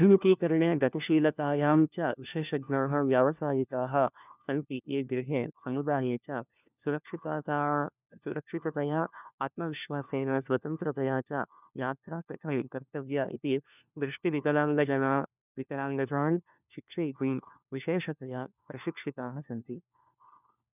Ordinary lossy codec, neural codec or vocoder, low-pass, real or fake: none; codec, 16 kHz, 1 kbps, FunCodec, trained on LibriTTS, 50 frames a second; 3.6 kHz; fake